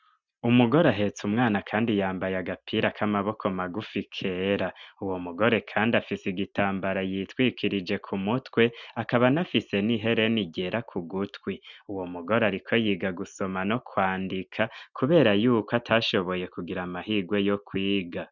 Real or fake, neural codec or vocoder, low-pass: real; none; 7.2 kHz